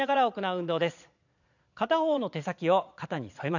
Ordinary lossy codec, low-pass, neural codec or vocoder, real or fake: none; 7.2 kHz; vocoder, 22.05 kHz, 80 mel bands, Vocos; fake